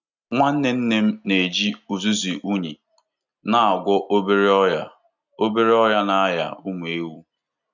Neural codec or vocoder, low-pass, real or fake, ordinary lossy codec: none; 7.2 kHz; real; none